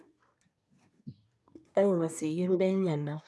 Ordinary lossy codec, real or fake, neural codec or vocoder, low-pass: none; fake; codec, 24 kHz, 1 kbps, SNAC; none